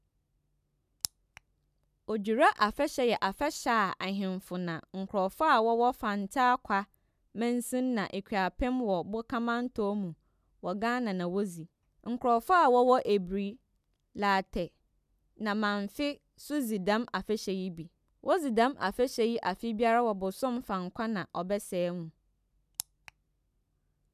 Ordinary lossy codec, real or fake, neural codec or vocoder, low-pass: none; real; none; 14.4 kHz